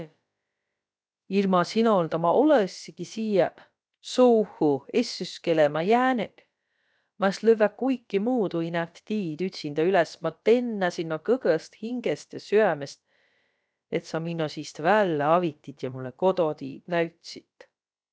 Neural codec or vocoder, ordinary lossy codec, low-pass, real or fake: codec, 16 kHz, about 1 kbps, DyCAST, with the encoder's durations; none; none; fake